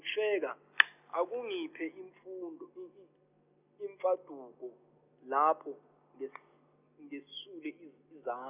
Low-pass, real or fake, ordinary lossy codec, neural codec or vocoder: 3.6 kHz; real; none; none